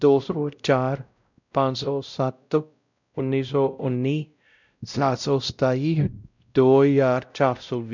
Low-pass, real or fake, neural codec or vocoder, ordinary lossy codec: 7.2 kHz; fake; codec, 16 kHz, 0.5 kbps, X-Codec, WavLM features, trained on Multilingual LibriSpeech; none